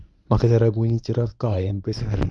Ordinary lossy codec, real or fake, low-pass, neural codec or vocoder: none; fake; none; codec, 24 kHz, 0.9 kbps, WavTokenizer, medium speech release version 1